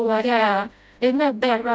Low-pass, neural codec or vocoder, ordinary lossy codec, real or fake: none; codec, 16 kHz, 0.5 kbps, FreqCodec, smaller model; none; fake